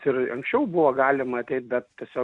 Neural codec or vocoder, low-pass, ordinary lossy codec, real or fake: none; 10.8 kHz; AAC, 64 kbps; real